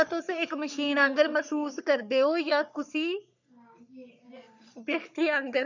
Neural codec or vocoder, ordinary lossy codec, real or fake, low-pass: codec, 44.1 kHz, 3.4 kbps, Pupu-Codec; none; fake; 7.2 kHz